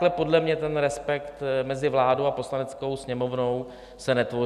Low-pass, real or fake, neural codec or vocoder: 14.4 kHz; real; none